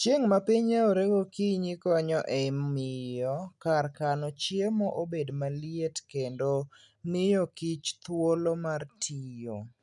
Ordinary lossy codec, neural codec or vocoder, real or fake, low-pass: none; none; real; 10.8 kHz